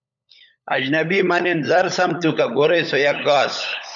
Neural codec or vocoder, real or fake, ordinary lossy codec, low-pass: codec, 16 kHz, 16 kbps, FunCodec, trained on LibriTTS, 50 frames a second; fake; MP3, 96 kbps; 7.2 kHz